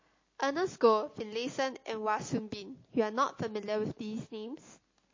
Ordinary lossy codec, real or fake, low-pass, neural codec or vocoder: MP3, 32 kbps; real; 7.2 kHz; none